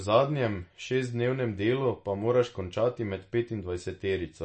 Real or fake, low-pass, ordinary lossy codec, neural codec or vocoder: real; 10.8 kHz; MP3, 32 kbps; none